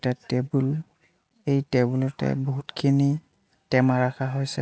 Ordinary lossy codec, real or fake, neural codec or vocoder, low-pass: none; real; none; none